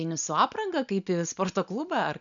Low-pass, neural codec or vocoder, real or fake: 7.2 kHz; none; real